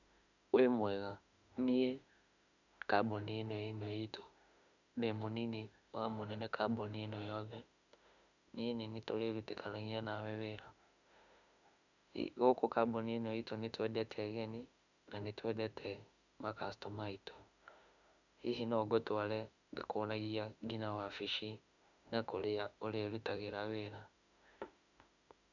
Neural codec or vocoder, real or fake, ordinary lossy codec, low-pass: autoencoder, 48 kHz, 32 numbers a frame, DAC-VAE, trained on Japanese speech; fake; none; 7.2 kHz